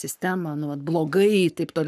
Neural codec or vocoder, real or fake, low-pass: codec, 44.1 kHz, 7.8 kbps, Pupu-Codec; fake; 14.4 kHz